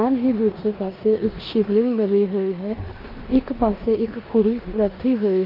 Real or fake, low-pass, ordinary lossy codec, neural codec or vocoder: fake; 5.4 kHz; Opus, 24 kbps; codec, 16 kHz in and 24 kHz out, 0.9 kbps, LongCat-Audio-Codec, four codebook decoder